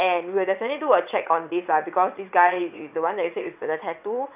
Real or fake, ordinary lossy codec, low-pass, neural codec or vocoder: fake; none; 3.6 kHz; vocoder, 22.05 kHz, 80 mel bands, WaveNeXt